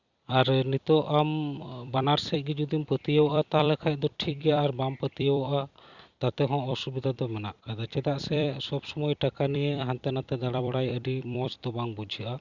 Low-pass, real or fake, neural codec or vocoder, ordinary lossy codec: 7.2 kHz; fake; vocoder, 44.1 kHz, 128 mel bands every 256 samples, BigVGAN v2; Opus, 64 kbps